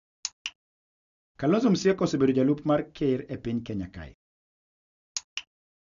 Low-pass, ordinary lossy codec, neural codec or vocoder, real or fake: 7.2 kHz; none; none; real